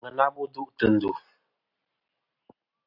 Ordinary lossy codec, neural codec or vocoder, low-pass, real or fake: AAC, 32 kbps; none; 5.4 kHz; real